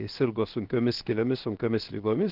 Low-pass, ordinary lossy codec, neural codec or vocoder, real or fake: 5.4 kHz; Opus, 16 kbps; codec, 16 kHz, 0.7 kbps, FocalCodec; fake